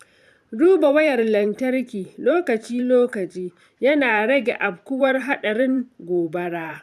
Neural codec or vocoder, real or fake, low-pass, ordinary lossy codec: vocoder, 44.1 kHz, 128 mel bands every 512 samples, BigVGAN v2; fake; 14.4 kHz; none